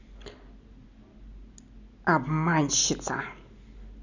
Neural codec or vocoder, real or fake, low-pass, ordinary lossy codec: none; real; 7.2 kHz; none